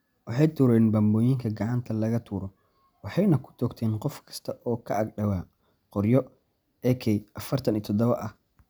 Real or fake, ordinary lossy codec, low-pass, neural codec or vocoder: real; none; none; none